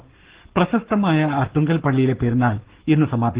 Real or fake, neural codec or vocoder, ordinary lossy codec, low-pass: fake; codec, 44.1 kHz, 7.8 kbps, Pupu-Codec; Opus, 16 kbps; 3.6 kHz